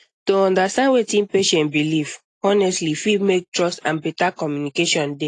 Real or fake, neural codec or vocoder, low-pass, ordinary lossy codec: real; none; 10.8 kHz; AAC, 48 kbps